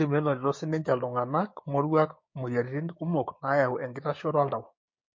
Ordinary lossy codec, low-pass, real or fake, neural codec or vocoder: MP3, 32 kbps; 7.2 kHz; fake; codec, 16 kHz, 6 kbps, DAC